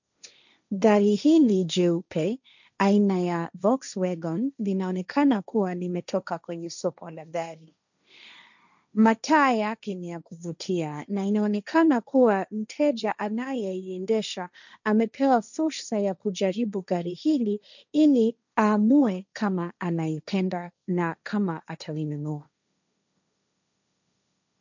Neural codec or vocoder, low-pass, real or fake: codec, 16 kHz, 1.1 kbps, Voila-Tokenizer; 7.2 kHz; fake